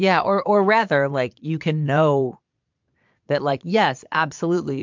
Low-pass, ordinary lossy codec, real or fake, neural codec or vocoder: 7.2 kHz; MP3, 64 kbps; fake; vocoder, 22.05 kHz, 80 mel bands, Vocos